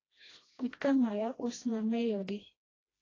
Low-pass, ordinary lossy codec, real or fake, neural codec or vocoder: 7.2 kHz; AAC, 32 kbps; fake; codec, 16 kHz, 1 kbps, FreqCodec, smaller model